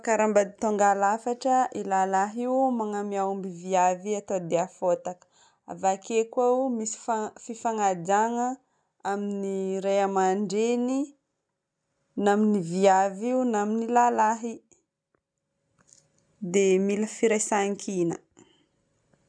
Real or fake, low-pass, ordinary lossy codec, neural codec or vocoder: real; 9.9 kHz; none; none